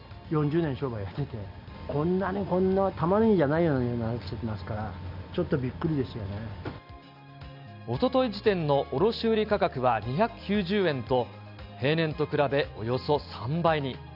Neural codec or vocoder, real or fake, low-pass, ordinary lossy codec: none; real; 5.4 kHz; none